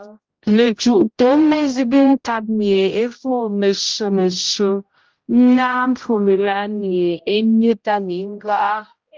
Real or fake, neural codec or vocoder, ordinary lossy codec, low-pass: fake; codec, 16 kHz, 0.5 kbps, X-Codec, HuBERT features, trained on general audio; Opus, 24 kbps; 7.2 kHz